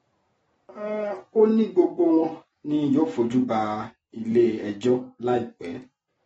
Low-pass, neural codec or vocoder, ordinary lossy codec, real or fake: 19.8 kHz; none; AAC, 24 kbps; real